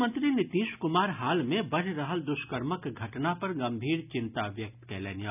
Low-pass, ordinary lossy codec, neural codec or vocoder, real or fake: 3.6 kHz; none; none; real